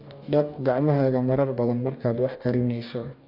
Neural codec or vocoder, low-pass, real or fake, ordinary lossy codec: codec, 44.1 kHz, 2.6 kbps, DAC; 5.4 kHz; fake; MP3, 48 kbps